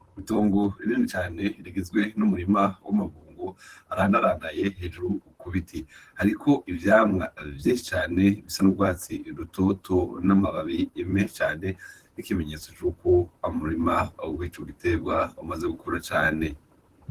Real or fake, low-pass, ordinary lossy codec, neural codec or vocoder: fake; 14.4 kHz; Opus, 16 kbps; vocoder, 44.1 kHz, 128 mel bands, Pupu-Vocoder